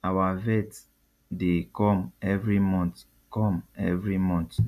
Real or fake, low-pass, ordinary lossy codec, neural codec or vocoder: real; 14.4 kHz; none; none